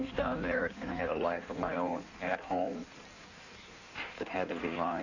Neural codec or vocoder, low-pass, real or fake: codec, 16 kHz in and 24 kHz out, 1.1 kbps, FireRedTTS-2 codec; 7.2 kHz; fake